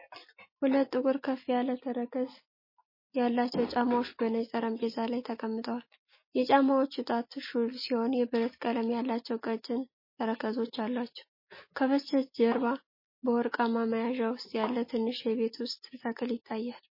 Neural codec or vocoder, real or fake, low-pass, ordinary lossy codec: none; real; 5.4 kHz; MP3, 24 kbps